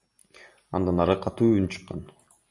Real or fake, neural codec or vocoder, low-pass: real; none; 10.8 kHz